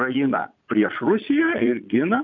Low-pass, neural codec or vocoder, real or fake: 7.2 kHz; vocoder, 22.05 kHz, 80 mel bands, Vocos; fake